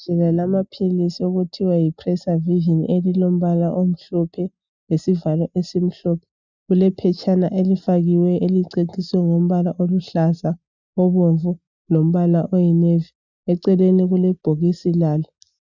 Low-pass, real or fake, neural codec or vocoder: 7.2 kHz; real; none